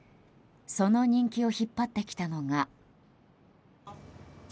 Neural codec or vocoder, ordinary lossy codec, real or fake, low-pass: none; none; real; none